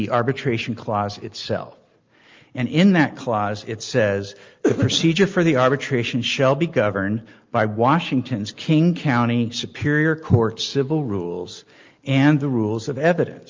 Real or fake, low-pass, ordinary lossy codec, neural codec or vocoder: real; 7.2 kHz; Opus, 32 kbps; none